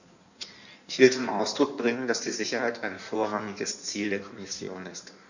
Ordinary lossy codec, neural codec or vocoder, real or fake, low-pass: none; codec, 16 kHz in and 24 kHz out, 1.1 kbps, FireRedTTS-2 codec; fake; 7.2 kHz